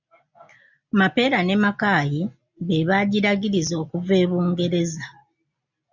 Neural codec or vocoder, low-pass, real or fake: none; 7.2 kHz; real